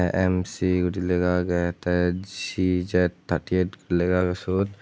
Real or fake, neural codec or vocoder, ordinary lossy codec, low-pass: real; none; none; none